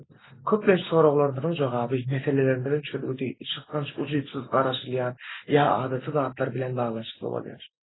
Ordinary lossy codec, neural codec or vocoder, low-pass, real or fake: AAC, 16 kbps; none; 7.2 kHz; real